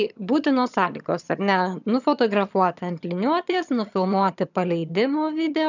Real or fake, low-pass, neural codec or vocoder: fake; 7.2 kHz; vocoder, 22.05 kHz, 80 mel bands, HiFi-GAN